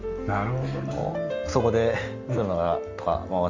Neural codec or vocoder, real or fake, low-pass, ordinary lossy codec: none; real; 7.2 kHz; Opus, 32 kbps